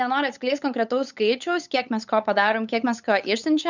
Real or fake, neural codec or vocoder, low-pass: fake; codec, 16 kHz, 8 kbps, FunCodec, trained on LibriTTS, 25 frames a second; 7.2 kHz